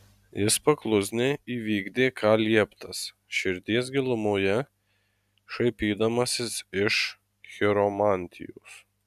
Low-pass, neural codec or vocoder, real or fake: 14.4 kHz; none; real